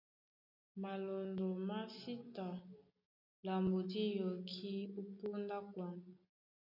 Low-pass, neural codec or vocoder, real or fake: 5.4 kHz; none; real